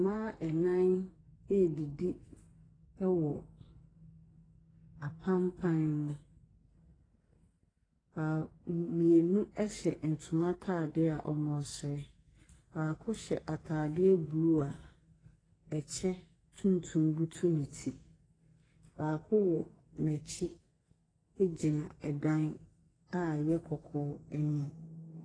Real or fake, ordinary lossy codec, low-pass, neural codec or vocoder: fake; AAC, 32 kbps; 9.9 kHz; codec, 44.1 kHz, 2.6 kbps, SNAC